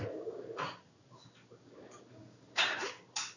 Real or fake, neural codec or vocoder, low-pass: fake; codec, 16 kHz in and 24 kHz out, 1 kbps, XY-Tokenizer; 7.2 kHz